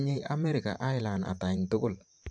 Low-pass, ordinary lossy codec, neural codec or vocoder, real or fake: 9.9 kHz; AAC, 64 kbps; vocoder, 44.1 kHz, 128 mel bands every 512 samples, BigVGAN v2; fake